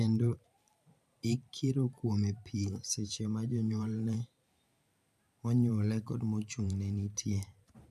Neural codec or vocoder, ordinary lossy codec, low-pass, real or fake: vocoder, 44.1 kHz, 128 mel bands every 512 samples, BigVGAN v2; none; 14.4 kHz; fake